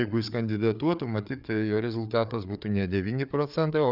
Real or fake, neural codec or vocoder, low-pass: fake; codec, 16 kHz, 4 kbps, FreqCodec, larger model; 5.4 kHz